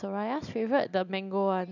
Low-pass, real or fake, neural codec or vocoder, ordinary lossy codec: 7.2 kHz; real; none; none